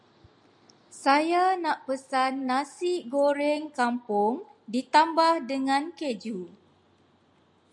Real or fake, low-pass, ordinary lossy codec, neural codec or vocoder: real; 10.8 kHz; MP3, 96 kbps; none